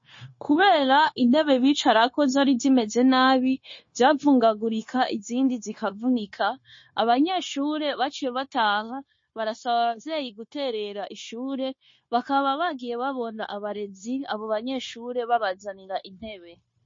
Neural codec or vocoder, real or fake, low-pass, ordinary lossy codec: codec, 16 kHz, 0.9 kbps, LongCat-Audio-Codec; fake; 7.2 kHz; MP3, 32 kbps